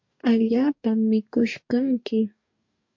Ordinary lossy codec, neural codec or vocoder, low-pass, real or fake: MP3, 48 kbps; codec, 44.1 kHz, 2.6 kbps, DAC; 7.2 kHz; fake